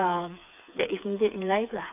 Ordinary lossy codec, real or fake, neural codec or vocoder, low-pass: none; fake; codec, 16 kHz, 4 kbps, FreqCodec, smaller model; 3.6 kHz